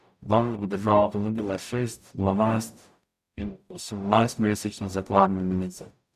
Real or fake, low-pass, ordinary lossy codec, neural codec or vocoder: fake; 14.4 kHz; none; codec, 44.1 kHz, 0.9 kbps, DAC